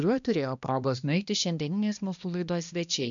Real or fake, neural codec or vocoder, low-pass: fake; codec, 16 kHz, 1 kbps, X-Codec, HuBERT features, trained on balanced general audio; 7.2 kHz